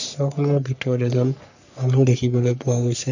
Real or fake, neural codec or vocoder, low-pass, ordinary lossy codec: fake; codec, 44.1 kHz, 3.4 kbps, Pupu-Codec; 7.2 kHz; none